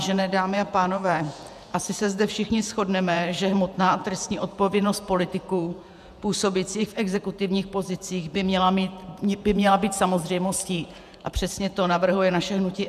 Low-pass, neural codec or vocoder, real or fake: 14.4 kHz; vocoder, 48 kHz, 128 mel bands, Vocos; fake